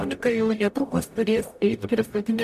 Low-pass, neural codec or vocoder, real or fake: 14.4 kHz; codec, 44.1 kHz, 0.9 kbps, DAC; fake